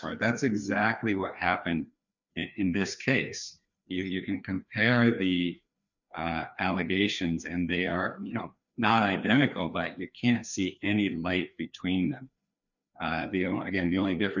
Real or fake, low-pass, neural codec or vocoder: fake; 7.2 kHz; codec, 16 kHz, 2 kbps, FreqCodec, larger model